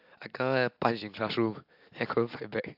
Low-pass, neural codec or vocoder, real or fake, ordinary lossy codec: 5.4 kHz; none; real; AAC, 48 kbps